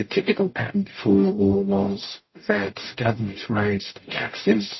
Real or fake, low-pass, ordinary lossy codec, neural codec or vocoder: fake; 7.2 kHz; MP3, 24 kbps; codec, 44.1 kHz, 0.9 kbps, DAC